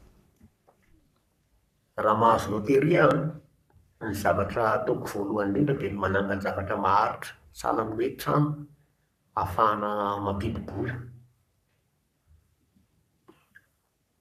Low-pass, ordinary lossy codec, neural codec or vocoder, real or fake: 14.4 kHz; none; codec, 44.1 kHz, 3.4 kbps, Pupu-Codec; fake